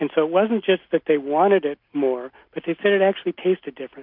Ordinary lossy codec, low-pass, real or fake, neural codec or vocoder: MP3, 48 kbps; 5.4 kHz; real; none